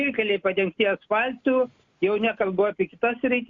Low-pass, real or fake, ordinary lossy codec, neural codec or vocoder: 7.2 kHz; real; Opus, 64 kbps; none